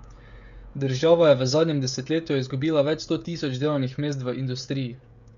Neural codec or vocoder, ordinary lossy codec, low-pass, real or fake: codec, 16 kHz, 16 kbps, FreqCodec, smaller model; Opus, 64 kbps; 7.2 kHz; fake